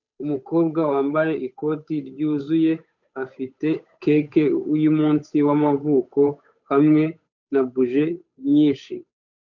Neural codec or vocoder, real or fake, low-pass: codec, 16 kHz, 8 kbps, FunCodec, trained on Chinese and English, 25 frames a second; fake; 7.2 kHz